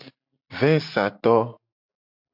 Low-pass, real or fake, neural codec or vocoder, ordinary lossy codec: 5.4 kHz; real; none; MP3, 48 kbps